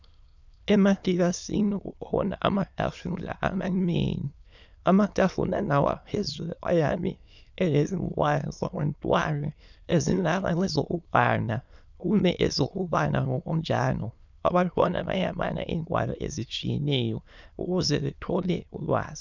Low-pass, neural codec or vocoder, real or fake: 7.2 kHz; autoencoder, 22.05 kHz, a latent of 192 numbers a frame, VITS, trained on many speakers; fake